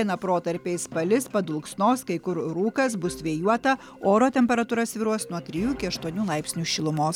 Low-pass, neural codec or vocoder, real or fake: 19.8 kHz; none; real